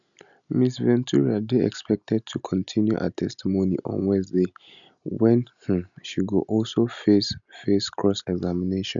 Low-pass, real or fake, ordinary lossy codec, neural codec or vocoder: 7.2 kHz; real; none; none